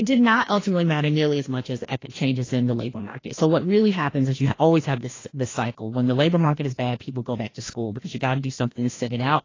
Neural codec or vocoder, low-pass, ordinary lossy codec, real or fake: codec, 16 kHz, 1 kbps, FreqCodec, larger model; 7.2 kHz; AAC, 32 kbps; fake